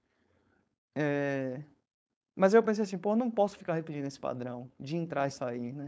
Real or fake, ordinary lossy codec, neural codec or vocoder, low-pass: fake; none; codec, 16 kHz, 4.8 kbps, FACodec; none